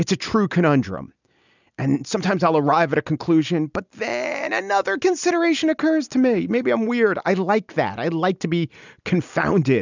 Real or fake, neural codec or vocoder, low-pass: real; none; 7.2 kHz